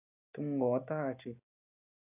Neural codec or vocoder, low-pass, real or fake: none; 3.6 kHz; real